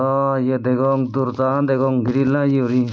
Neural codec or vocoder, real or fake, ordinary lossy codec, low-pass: none; real; none; none